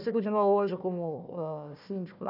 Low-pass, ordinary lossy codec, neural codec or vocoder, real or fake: 5.4 kHz; none; codec, 16 kHz, 1 kbps, FunCodec, trained on Chinese and English, 50 frames a second; fake